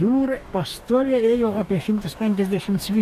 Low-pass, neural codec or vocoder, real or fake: 14.4 kHz; codec, 44.1 kHz, 2.6 kbps, DAC; fake